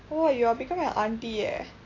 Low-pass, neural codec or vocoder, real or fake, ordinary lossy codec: 7.2 kHz; none; real; AAC, 32 kbps